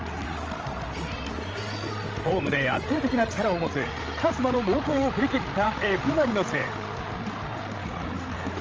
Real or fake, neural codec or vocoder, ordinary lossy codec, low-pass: fake; codec, 16 kHz, 16 kbps, FreqCodec, larger model; Opus, 24 kbps; 7.2 kHz